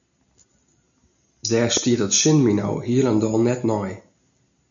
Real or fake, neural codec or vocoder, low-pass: real; none; 7.2 kHz